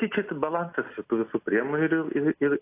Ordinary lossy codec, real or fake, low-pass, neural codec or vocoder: AAC, 24 kbps; real; 3.6 kHz; none